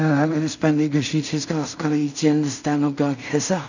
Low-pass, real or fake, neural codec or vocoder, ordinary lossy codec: 7.2 kHz; fake; codec, 16 kHz in and 24 kHz out, 0.4 kbps, LongCat-Audio-Codec, two codebook decoder; none